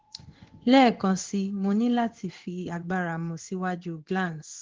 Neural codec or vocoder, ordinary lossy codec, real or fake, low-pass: codec, 16 kHz in and 24 kHz out, 1 kbps, XY-Tokenizer; Opus, 16 kbps; fake; 7.2 kHz